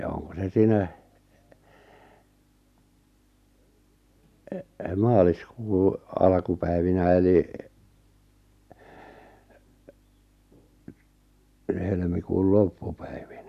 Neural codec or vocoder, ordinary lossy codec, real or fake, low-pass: none; none; real; 14.4 kHz